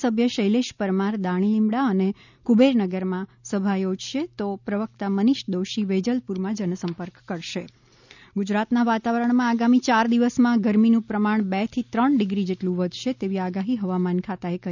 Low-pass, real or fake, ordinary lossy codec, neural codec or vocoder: 7.2 kHz; real; none; none